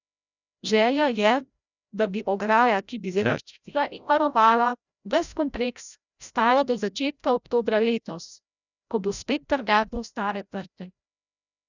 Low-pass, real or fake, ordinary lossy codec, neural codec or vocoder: 7.2 kHz; fake; none; codec, 16 kHz, 0.5 kbps, FreqCodec, larger model